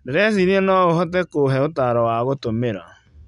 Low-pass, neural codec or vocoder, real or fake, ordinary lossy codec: 10.8 kHz; none; real; none